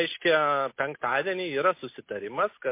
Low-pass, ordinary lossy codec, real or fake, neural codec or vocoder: 3.6 kHz; MP3, 24 kbps; real; none